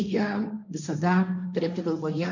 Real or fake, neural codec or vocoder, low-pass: fake; codec, 16 kHz, 1.1 kbps, Voila-Tokenizer; 7.2 kHz